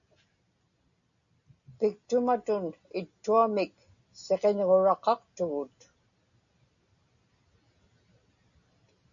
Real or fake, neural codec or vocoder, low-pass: real; none; 7.2 kHz